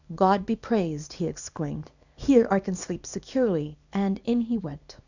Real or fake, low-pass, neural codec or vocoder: fake; 7.2 kHz; codec, 24 kHz, 0.9 kbps, WavTokenizer, small release